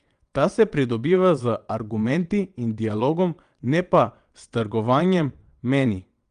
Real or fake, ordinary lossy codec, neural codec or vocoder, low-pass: fake; Opus, 32 kbps; vocoder, 22.05 kHz, 80 mel bands, WaveNeXt; 9.9 kHz